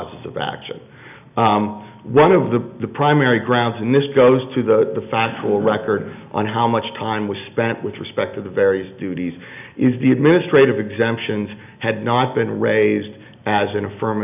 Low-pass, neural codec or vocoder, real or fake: 3.6 kHz; none; real